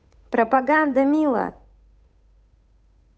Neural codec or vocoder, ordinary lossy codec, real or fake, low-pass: codec, 16 kHz, 8 kbps, FunCodec, trained on Chinese and English, 25 frames a second; none; fake; none